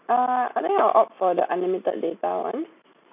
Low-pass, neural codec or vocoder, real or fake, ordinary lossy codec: 3.6 kHz; none; real; none